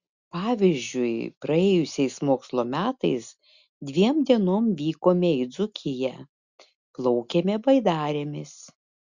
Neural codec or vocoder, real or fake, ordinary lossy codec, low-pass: none; real; Opus, 64 kbps; 7.2 kHz